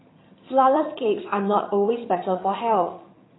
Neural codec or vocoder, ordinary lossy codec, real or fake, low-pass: vocoder, 22.05 kHz, 80 mel bands, HiFi-GAN; AAC, 16 kbps; fake; 7.2 kHz